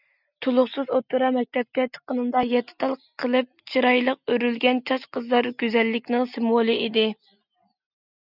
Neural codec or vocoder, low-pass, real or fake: none; 5.4 kHz; real